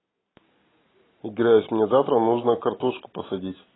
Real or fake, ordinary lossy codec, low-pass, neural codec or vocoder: real; AAC, 16 kbps; 7.2 kHz; none